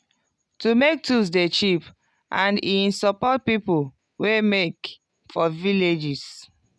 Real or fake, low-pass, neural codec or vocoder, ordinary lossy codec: real; none; none; none